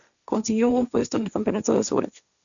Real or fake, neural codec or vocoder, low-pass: fake; codec, 16 kHz, 1.1 kbps, Voila-Tokenizer; 7.2 kHz